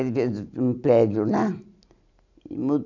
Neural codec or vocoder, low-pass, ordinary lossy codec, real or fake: none; 7.2 kHz; none; real